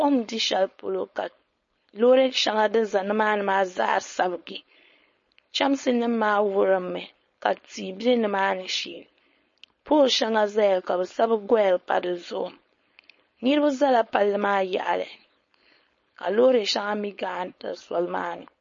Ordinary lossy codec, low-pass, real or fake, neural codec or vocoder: MP3, 32 kbps; 7.2 kHz; fake; codec, 16 kHz, 4.8 kbps, FACodec